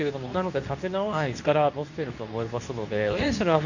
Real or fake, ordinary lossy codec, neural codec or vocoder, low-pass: fake; none; codec, 24 kHz, 0.9 kbps, WavTokenizer, medium speech release version 2; 7.2 kHz